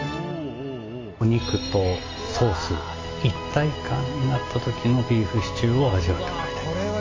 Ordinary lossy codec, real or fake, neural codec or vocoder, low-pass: AAC, 32 kbps; real; none; 7.2 kHz